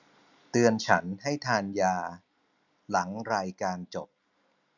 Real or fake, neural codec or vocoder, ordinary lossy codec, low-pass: real; none; none; 7.2 kHz